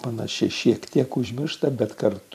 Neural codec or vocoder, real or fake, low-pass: vocoder, 44.1 kHz, 128 mel bands every 512 samples, BigVGAN v2; fake; 14.4 kHz